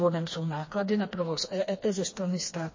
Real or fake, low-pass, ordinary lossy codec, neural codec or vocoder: fake; 7.2 kHz; MP3, 32 kbps; codec, 16 kHz, 2 kbps, FreqCodec, smaller model